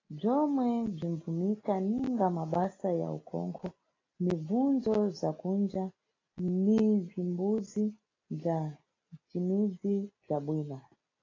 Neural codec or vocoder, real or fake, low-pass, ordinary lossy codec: none; real; 7.2 kHz; AAC, 32 kbps